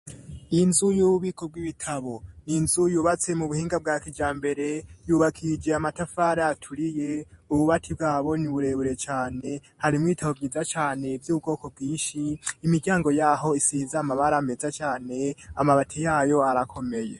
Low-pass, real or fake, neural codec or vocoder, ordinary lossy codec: 14.4 kHz; fake; vocoder, 48 kHz, 128 mel bands, Vocos; MP3, 48 kbps